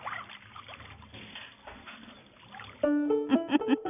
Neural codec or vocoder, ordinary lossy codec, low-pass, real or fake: none; none; 3.6 kHz; real